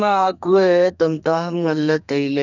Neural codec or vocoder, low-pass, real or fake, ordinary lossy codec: codec, 32 kHz, 1.9 kbps, SNAC; 7.2 kHz; fake; none